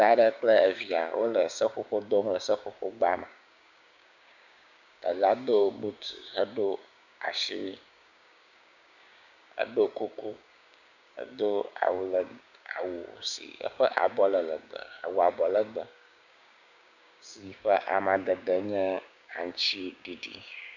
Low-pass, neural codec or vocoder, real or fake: 7.2 kHz; codec, 16 kHz, 6 kbps, DAC; fake